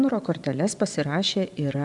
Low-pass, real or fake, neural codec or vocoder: 10.8 kHz; fake; vocoder, 24 kHz, 100 mel bands, Vocos